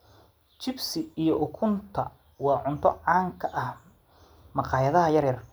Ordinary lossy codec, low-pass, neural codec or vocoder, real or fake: none; none; none; real